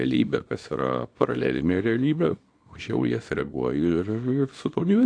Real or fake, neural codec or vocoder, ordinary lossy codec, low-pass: fake; codec, 24 kHz, 0.9 kbps, WavTokenizer, small release; AAC, 48 kbps; 9.9 kHz